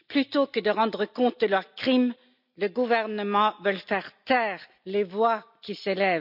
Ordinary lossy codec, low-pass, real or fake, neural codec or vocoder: none; 5.4 kHz; real; none